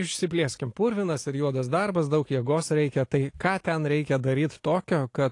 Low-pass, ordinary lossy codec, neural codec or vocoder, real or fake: 10.8 kHz; AAC, 48 kbps; none; real